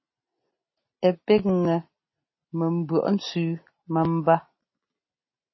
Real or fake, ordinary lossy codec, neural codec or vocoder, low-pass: real; MP3, 24 kbps; none; 7.2 kHz